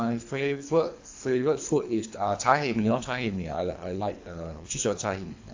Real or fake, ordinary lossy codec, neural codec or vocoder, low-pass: fake; AAC, 48 kbps; codec, 24 kHz, 3 kbps, HILCodec; 7.2 kHz